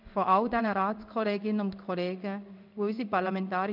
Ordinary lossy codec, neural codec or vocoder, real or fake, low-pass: none; codec, 16 kHz in and 24 kHz out, 1 kbps, XY-Tokenizer; fake; 5.4 kHz